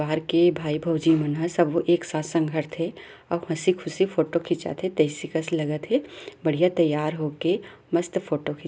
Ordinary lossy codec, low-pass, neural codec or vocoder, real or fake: none; none; none; real